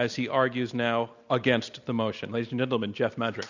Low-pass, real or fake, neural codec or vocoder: 7.2 kHz; real; none